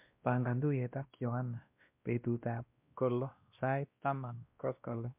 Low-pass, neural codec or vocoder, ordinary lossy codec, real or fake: 3.6 kHz; codec, 16 kHz, 1 kbps, X-Codec, WavLM features, trained on Multilingual LibriSpeech; MP3, 32 kbps; fake